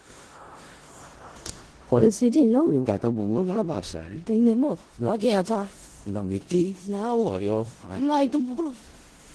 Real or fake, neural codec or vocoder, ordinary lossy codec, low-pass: fake; codec, 16 kHz in and 24 kHz out, 0.4 kbps, LongCat-Audio-Codec, four codebook decoder; Opus, 16 kbps; 10.8 kHz